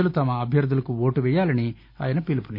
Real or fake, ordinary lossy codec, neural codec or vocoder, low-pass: real; none; none; 5.4 kHz